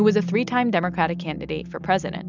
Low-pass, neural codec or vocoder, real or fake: 7.2 kHz; none; real